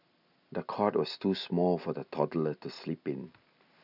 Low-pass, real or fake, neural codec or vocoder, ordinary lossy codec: 5.4 kHz; real; none; none